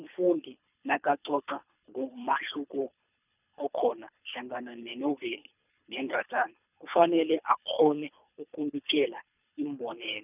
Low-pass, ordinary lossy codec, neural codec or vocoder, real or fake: 3.6 kHz; none; codec, 24 kHz, 3 kbps, HILCodec; fake